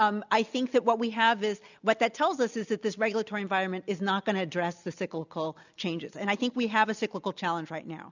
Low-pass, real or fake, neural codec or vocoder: 7.2 kHz; real; none